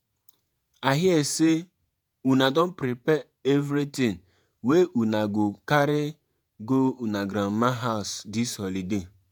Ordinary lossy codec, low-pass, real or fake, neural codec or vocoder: none; none; fake; vocoder, 48 kHz, 128 mel bands, Vocos